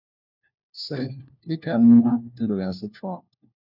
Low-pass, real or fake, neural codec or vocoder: 5.4 kHz; fake; codec, 16 kHz, 1 kbps, FunCodec, trained on LibriTTS, 50 frames a second